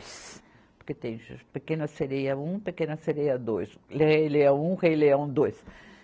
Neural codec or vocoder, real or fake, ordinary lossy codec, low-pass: none; real; none; none